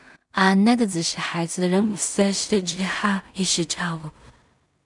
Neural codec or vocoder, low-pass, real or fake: codec, 16 kHz in and 24 kHz out, 0.4 kbps, LongCat-Audio-Codec, two codebook decoder; 10.8 kHz; fake